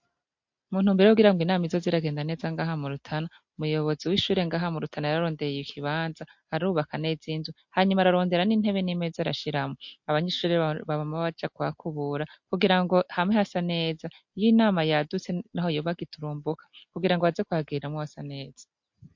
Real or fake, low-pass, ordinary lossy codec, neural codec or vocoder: real; 7.2 kHz; MP3, 48 kbps; none